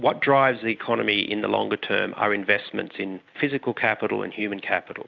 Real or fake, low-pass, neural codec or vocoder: real; 7.2 kHz; none